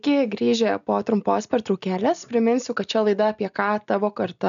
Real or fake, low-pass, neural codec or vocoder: real; 7.2 kHz; none